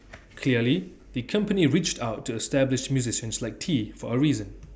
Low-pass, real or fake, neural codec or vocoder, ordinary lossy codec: none; real; none; none